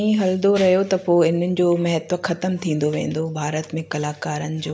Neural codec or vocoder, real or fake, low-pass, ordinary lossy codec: none; real; none; none